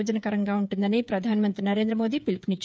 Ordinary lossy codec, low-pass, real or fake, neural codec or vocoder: none; none; fake; codec, 16 kHz, 8 kbps, FreqCodec, smaller model